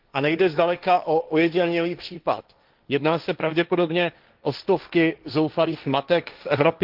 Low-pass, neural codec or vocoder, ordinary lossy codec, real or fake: 5.4 kHz; codec, 16 kHz, 1.1 kbps, Voila-Tokenizer; Opus, 32 kbps; fake